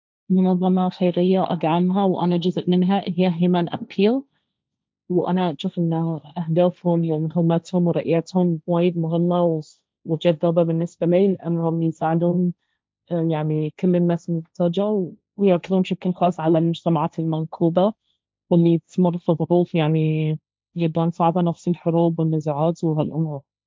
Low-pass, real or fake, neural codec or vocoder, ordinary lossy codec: none; fake; codec, 16 kHz, 1.1 kbps, Voila-Tokenizer; none